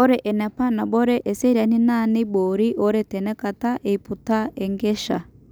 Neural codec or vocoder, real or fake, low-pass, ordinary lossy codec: none; real; none; none